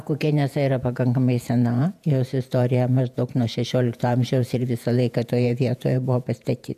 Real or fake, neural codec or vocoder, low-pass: fake; vocoder, 48 kHz, 128 mel bands, Vocos; 14.4 kHz